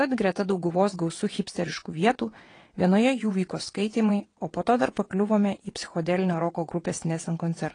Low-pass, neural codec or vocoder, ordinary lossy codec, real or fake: 9.9 kHz; vocoder, 22.05 kHz, 80 mel bands, WaveNeXt; AAC, 32 kbps; fake